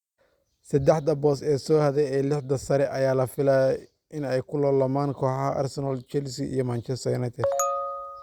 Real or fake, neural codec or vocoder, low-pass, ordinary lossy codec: real; none; 19.8 kHz; Opus, 64 kbps